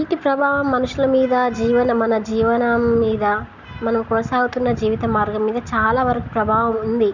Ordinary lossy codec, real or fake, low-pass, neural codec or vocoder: none; real; 7.2 kHz; none